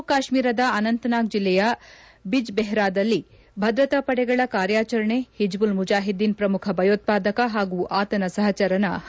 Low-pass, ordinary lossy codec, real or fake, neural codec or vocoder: none; none; real; none